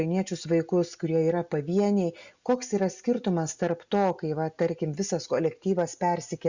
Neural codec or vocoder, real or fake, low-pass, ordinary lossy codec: none; real; 7.2 kHz; Opus, 64 kbps